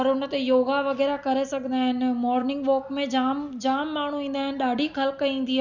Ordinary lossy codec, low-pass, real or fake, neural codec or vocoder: none; 7.2 kHz; real; none